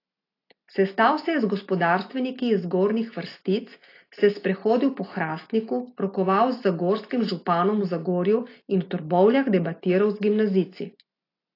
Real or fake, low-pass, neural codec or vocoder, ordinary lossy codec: real; 5.4 kHz; none; AAC, 32 kbps